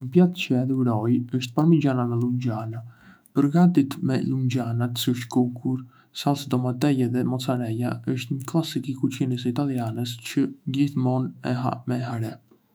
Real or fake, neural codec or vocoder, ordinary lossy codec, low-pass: fake; autoencoder, 48 kHz, 128 numbers a frame, DAC-VAE, trained on Japanese speech; none; none